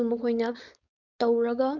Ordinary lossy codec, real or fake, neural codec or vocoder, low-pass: none; fake; codec, 16 kHz, 4.8 kbps, FACodec; 7.2 kHz